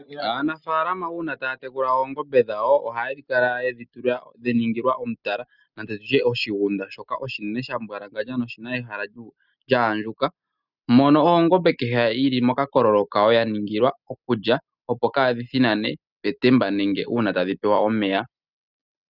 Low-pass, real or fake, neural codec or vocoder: 5.4 kHz; real; none